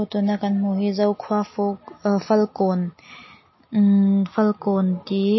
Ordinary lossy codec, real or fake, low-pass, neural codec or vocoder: MP3, 24 kbps; real; 7.2 kHz; none